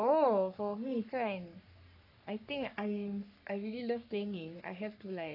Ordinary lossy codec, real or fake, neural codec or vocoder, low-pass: AAC, 48 kbps; fake; codec, 44.1 kHz, 3.4 kbps, Pupu-Codec; 5.4 kHz